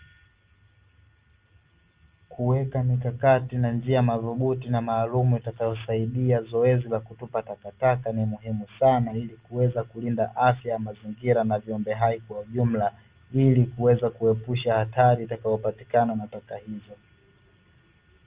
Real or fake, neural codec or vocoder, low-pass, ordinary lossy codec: real; none; 3.6 kHz; Opus, 32 kbps